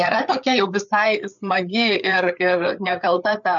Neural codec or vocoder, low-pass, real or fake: codec, 16 kHz, 4 kbps, FreqCodec, larger model; 7.2 kHz; fake